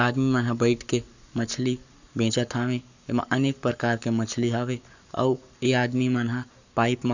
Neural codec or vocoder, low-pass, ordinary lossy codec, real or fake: codec, 44.1 kHz, 7.8 kbps, Pupu-Codec; 7.2 kHz; none; fake